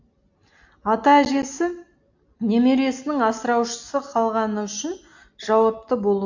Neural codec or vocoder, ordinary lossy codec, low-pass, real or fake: none; AAC, 48 kbps; 7.2 kHz; real